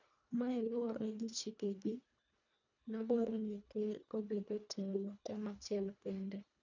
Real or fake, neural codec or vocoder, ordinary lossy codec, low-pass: fake; codec, 24 kHz, 1.5 kbps, HILCodec; none; 7.2 kHz